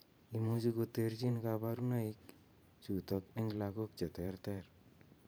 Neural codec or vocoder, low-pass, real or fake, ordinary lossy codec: vocoder, 44.1 kHz, 128 mel bands every 512 samples, BigVGAN v2; none; fake; none